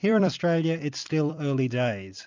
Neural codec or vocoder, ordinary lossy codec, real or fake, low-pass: vocoder, 44.1 kHz, 128 mel bands every 256 samples, BigVGAN v2; MP3, 64 kbps; fake; 7.2 kHz